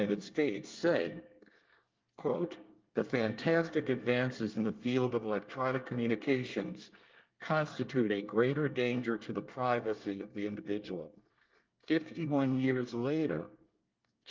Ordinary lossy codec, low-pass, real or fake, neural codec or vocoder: Opus, 32 kbps; 7.2 kHz; fake; codec, 24 kHz, 1 kbps, SNAC